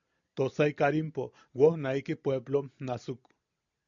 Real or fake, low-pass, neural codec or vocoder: real; 7.2 kHz; none